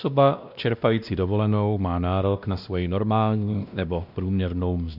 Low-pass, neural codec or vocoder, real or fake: 5.4 kHz; codec, 16 kHz, 1 kbps, X-Codec, WavLM features, trained on Multilingual LibriSpeech; fake